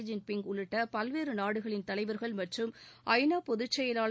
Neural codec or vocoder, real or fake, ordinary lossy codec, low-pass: none; real; none; none